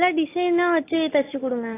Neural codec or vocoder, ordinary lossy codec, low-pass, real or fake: none; AAC, 24 kbps; 3.6 kHz; real